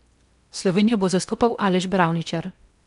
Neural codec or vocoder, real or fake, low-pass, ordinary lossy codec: codec, 16 kHz in and 24 kHz out, 0.8 kbps, FocalCodec, streaming, 65536 codes; fake; 10.8 kHz; none